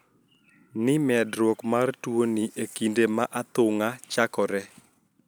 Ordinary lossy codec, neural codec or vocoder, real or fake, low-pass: none; none; real; none